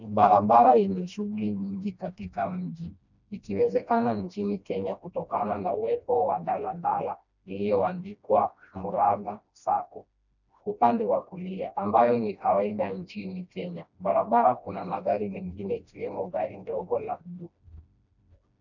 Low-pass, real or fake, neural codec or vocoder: 7.2 kHz; fake; codec, 16 kHz, 1 kbps, FreqCodec, smaller model